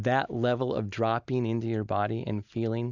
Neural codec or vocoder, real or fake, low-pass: none; real; 7.2 kHz